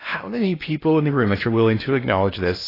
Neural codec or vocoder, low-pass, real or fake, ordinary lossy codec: codec, 16 kHz in and 24 kHz out, 0.8 kbps, FocalCodec, streaming, 65536 codes; 5.4 kHz; fake; AAC, 24 kbps